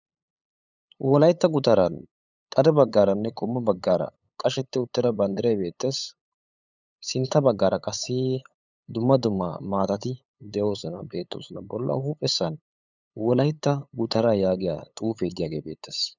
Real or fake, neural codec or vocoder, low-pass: fake; codec, 16 kHz, 8 kbps, FunCodec, trained on LibriTTS, 25 frames a second; 7.2 kHz